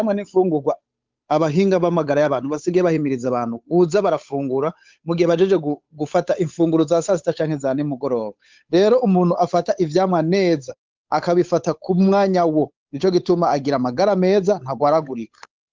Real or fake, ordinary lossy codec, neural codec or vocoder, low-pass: fake; Opus, 24 kbps; codec, 16 kHz, 8 kbps, FunCodec, trained on Chinese and English, 25 frames a second; 7.2 kHz